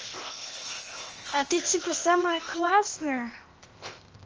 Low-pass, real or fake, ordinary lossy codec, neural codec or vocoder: 7.2 kHz; fake; Opus, 24 kbps; codec, 16 kHz, 0.8 kbps, ZipCodec